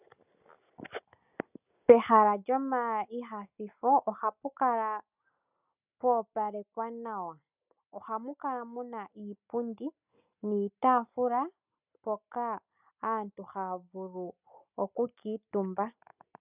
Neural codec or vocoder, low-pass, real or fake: none; 3.6 kHz; real